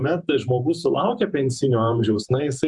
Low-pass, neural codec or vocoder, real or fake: 10.8 kHz; vocoder, 48 kHz, 128 mel bands, Vocos; fake